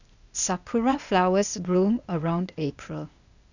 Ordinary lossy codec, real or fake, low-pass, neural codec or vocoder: none; fake; 7.2 kHz; codec, 16 kHz, 0.8 kbps, ZipCodec